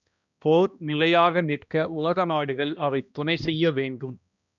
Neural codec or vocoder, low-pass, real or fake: codec, 16 kHz, 1 kbps, X-Codec, HuBERT features, trained on balanced general audio; 7.2 kHz; fake